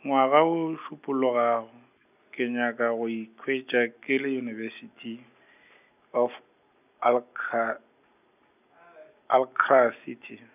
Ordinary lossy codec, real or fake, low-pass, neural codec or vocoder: none; real; 3.6 kHz; none